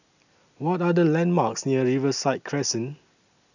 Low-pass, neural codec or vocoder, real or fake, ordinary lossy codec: 7.2 kHz; none; real; none